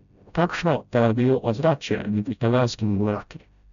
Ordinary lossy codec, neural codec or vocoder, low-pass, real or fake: none; codec, 16 kHz, 0.5 kbps, FreqCodec, smaller model; 7.2 kHz; fake